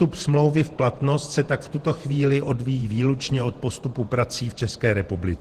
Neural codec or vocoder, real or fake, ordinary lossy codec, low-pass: vocoder, 48 kHz, 128 mel bands, Vocos; fake; Opus, 16 kbps; 14.4 kHz